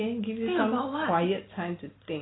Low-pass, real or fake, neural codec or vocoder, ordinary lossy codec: 7.2 kHz; real; none; AAC, 16 kbps